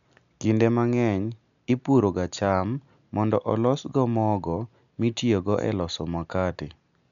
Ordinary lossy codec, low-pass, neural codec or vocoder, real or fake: none; 7.2 kHz; none; real